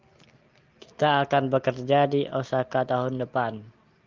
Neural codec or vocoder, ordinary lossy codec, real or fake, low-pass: none; Opus, 24 kbps; real; 7.2 kHz